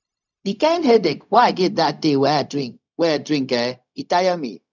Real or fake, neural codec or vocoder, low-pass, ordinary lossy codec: fake; codec, 16 kHz, 0.4 kbps, LongCat-Audio-Codec; 7.2 kHz; none